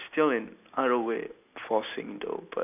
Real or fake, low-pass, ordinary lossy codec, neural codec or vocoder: real; 3.6 kHz; none; none